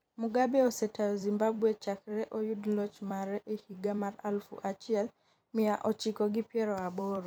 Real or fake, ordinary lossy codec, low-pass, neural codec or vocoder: fake; none; none; vocoder, 44.1 kHz, 128 mel bands every 256 samples, BigVGAN v2